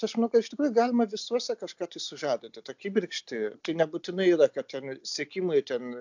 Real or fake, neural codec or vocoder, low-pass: real; none; 7.2 kHz